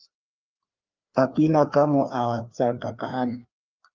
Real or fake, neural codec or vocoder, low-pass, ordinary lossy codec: fake; codec, 16 kHz, 2 kbps, FreqCodec, larger model; 7.2 kHz; Opus, 24 kbps